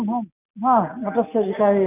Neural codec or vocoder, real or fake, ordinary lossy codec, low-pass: none; real; none; 3.6 kHz